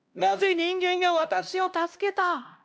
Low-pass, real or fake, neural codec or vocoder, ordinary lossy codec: none; fake; codec, 16 kHz, 1 kbps, X-Codec, HuBERT features, trained on LibriSpeech; none